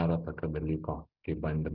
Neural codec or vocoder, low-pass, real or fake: none; 5.4 kHz; real